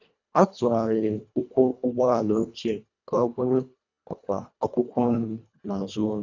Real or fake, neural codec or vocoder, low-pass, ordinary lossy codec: fake; codec, 24 kHz, 1.5 kbps, HILCodec; 7.2 kHz; none